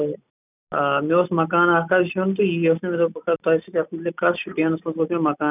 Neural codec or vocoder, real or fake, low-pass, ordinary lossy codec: none; real; 3.6 kHz; none